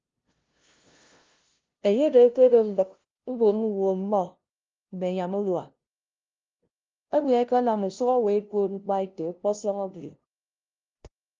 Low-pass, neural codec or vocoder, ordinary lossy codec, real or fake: 7.2 kHz; codec, 16 kHz, 0.5 kbps, FunCodec, trained on LibriTTS, 25 frames a second; Opus, 32 kbps; fake